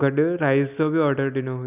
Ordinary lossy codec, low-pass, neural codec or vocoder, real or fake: none; 3.6 kHz; none; real